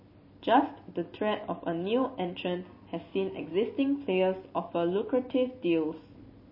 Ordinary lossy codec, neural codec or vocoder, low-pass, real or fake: MP3, 24 kbps; none; 5.4 kHz; real